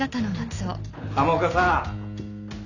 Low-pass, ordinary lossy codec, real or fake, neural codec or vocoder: 7.2 kHz; none; real; none